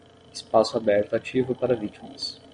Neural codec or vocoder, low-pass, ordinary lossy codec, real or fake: none; 9.9 kHz; MP3, 48 kbps; real